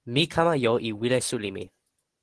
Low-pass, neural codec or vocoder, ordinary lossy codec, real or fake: 10.8 kHz; none; Opus, 16 kbps; real